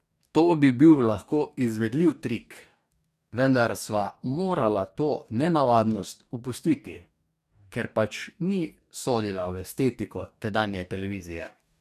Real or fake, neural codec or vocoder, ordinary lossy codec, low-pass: fake; codec, 44.1 kHz, 2.6 kbps, DAC; none; 14.4 kHz